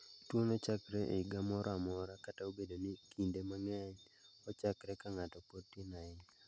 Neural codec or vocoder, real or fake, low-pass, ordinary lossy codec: none; real; none; none